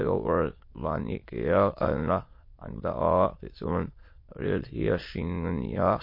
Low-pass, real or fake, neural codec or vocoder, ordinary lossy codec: 5.4 kHz; fake; autoencoder, 22.05 kHz, a latent of 192 numbers a frame, VITS, trained on many speakers; MP3, 32 kbps